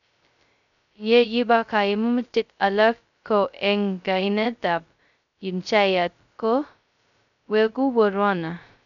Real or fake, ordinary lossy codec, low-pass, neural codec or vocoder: fake; none; 7.2 kHz; codec, 16 kHz, 0.2 kbps, FocalCodec